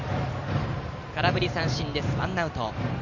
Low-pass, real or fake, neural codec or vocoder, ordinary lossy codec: 7.2 kHz; fake; vocoder, 44.1 kHz, 128 mel bands every 512 samples, BigVGAN v2; none